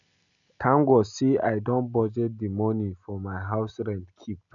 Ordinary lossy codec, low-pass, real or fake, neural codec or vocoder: none; 7.2 kHz; real; none